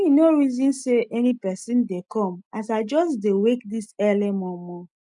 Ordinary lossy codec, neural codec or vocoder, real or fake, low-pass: MP3, 96 kbps; none; real; 10.8 kHz